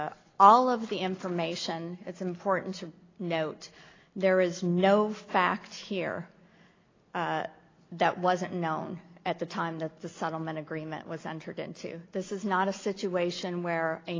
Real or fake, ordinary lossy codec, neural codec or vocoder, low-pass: real; AAC, 32 kbps; none; 7.2 kHz